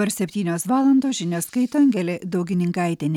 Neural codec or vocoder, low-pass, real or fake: none; 19.8 kHz; real